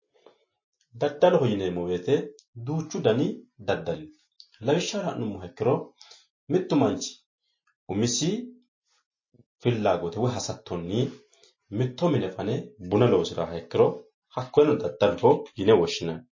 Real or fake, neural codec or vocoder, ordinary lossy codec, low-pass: real; none; MP3, 32 kbps; 7.2 kHz